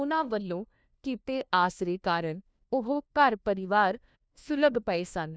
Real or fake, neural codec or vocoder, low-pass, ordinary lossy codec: fake; codec, 16 kHz, 1 kbps, FunCodec, trained on LibriTTS, 50 frames a second; none; none